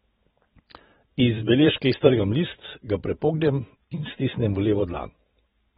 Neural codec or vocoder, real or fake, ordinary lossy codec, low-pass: vocoder, 44.1 kHz, 128 mel bands every 512 samples, BigVGAN v2; fake; AAC, 16 kbps; 19.8 kHz